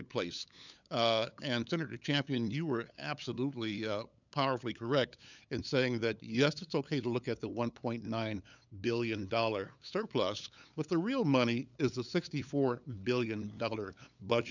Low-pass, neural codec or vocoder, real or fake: 7.2 kHz; codec, 16 kHz, 8 kbps, FunCodec, trained on LibriTTS, 25 frames a second; fake